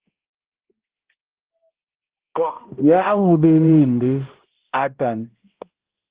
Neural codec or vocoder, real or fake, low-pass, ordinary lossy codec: codec, 16 kHz, 0.5 kbps, X-Codec, HuBERT features, trained on balanced general audio; fake; 3.6 kHz; Opus, 16 kbps